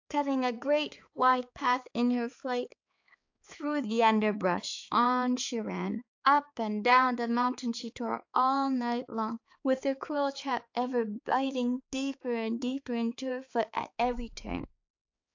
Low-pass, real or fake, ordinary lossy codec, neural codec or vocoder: 7.2 kHz; fake; AAC, 48 kbps; codec, 16 kHz, 4 kbps, X-Codec, HuBERT features, trained on balanced general audio